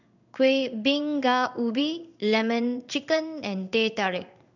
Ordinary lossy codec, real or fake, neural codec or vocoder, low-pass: none; fake; codec, 16 kHz in and 24 kHz out, 1 kbps, XY-Tokenizer; 7.2 kHz